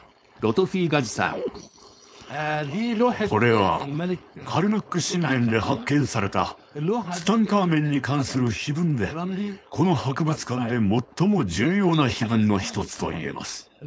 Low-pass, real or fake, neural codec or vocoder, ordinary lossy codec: none; fake; codec, 16 kHz, 4.8 kbps, FACodec; none